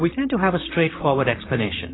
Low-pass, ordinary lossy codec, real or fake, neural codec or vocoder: 7.2 kHz; AAC, 16 kbps; fake; vocoder, 44.1 kHz, 128 mel bands, Pupu-Vocoder